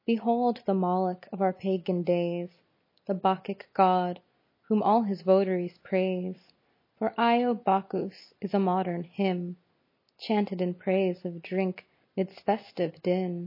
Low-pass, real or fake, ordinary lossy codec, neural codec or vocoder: 5.4 kHz; real; MP3, 24 kbps; none